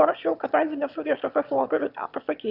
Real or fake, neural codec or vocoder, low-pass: fake; autoencoder, 22.05 kHz, a latent of 192 numbers a frame, VITS, trained on one speaker; 5.4 kHz